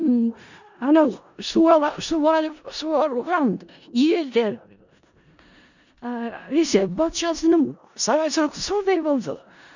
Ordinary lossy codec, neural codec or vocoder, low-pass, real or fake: none; codec, 16 kHz in and 24 kHz out, 0.4 kbps, LongCat-Audio-Codec, four codebook decoder; 7.2 kHz; fake